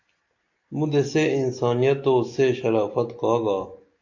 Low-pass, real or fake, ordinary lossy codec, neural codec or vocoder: 7.2 kHz; real; AAC, 48 kbps; none